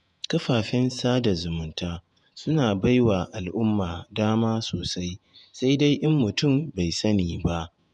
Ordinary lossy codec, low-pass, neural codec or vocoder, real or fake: none; 10.8 kHz; vocoder, 48 kHz, 128 mel bands, Vocos; fake